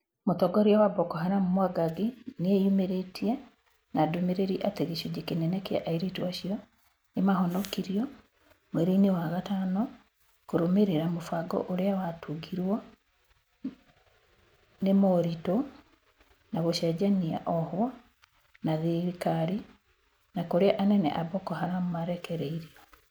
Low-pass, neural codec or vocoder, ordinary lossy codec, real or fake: none; none; none; real